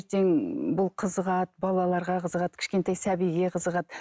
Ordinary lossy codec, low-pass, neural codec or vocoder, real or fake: none; none; none; real